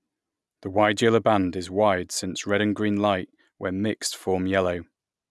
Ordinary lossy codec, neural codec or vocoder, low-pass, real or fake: none; none; none; real